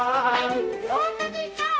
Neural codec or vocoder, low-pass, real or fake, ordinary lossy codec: codec, 16 kHz, 0.5 kbps, X-Codec, HuBERT features, trained on balanced general audio; none; fake; none